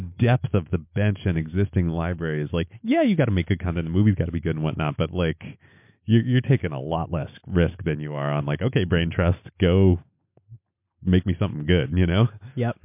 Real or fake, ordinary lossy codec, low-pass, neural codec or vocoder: real; MP3, 32 kbps; 3.6 kHz; none